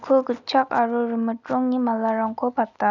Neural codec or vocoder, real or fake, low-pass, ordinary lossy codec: none; real; 7.2 kHz; none